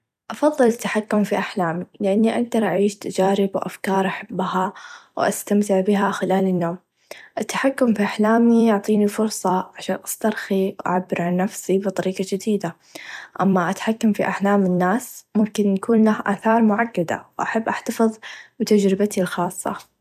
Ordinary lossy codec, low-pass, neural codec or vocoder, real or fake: none; 14.4 kHz; vocoder, 44.1 kHz, 128 mel bands every 256 samples, BigVGAN v2; fake